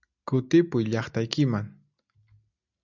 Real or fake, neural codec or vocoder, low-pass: real; none; 7.2 kHz